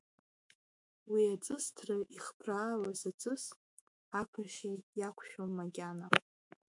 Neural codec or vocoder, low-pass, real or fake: autoencoder, 48 kHz, 128 numbers a frame, DAC-VAE, trained on Japanese speech; 10.8 kHz; fake